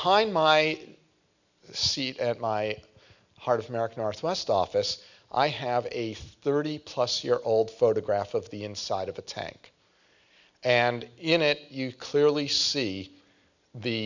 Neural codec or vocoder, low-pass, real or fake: none; 7.2 kHz; real